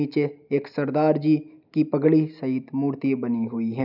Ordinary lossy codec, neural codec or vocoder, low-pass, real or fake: none; none; 5.4 kHz; real